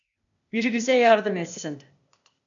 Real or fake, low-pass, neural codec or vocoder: fake; 7.2 kHz; codec, 16 kHz, 0.8 kbps, ZipCodec